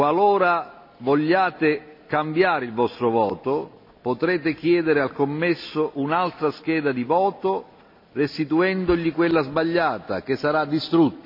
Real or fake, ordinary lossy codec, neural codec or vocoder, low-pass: real; MP3, 48 kbps; none; 5.4 kHz